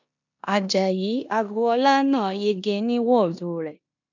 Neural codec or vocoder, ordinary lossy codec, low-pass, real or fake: codec, 16 kHz in and 24 kHz out, 0.9 kbps, LongCat-Audio-Codec, four codebook decoder; none; 7.2 kHz; fake